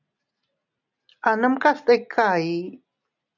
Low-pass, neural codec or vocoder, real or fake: 7.2 kHz; none; real